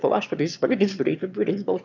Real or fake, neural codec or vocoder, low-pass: fake; autoencoder, 22.05 kHz, a latent of 192 numbers a frame, VITS, trained on one speaker; 7.2 kHz